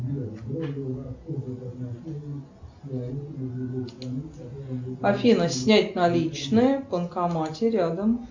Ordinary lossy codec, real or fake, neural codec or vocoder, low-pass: MP3, 48 kbps; real; none; 7.2 kHz